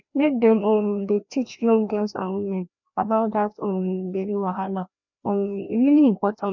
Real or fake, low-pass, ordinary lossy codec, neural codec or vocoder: fake; 7.2 kHz; none; codec, 16 kHz, 1 kbps, FreqCodec, larger model